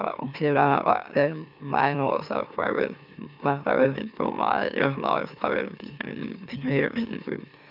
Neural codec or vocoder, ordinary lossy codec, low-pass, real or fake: autoencoder, 44.1 kHz, a latent of 192 numbers a frame, MeloTTS; none; 5.4 kHz; fake